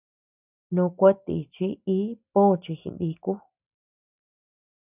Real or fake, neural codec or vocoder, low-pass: real; none; 3.6 kHz